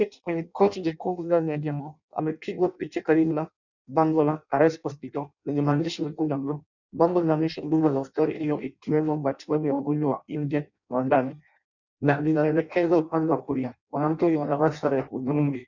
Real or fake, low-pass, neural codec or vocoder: fake; 7.2 kHz; codec, 16 kHz in and 24 kHz out, 0.6 kbps, FireRedTTS-2 codec